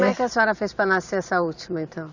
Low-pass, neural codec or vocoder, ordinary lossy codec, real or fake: 7.2 kHz; vocoder, 44.1 kHz, 128 mel bands every 512 samples, BigVGAN v2; none; fake